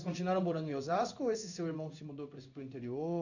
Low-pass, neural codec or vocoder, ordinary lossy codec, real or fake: 7.2 kHz; codec, 16 kHz in and 24 kHz out, 1 kbps, XY-Tokenizer; none; fake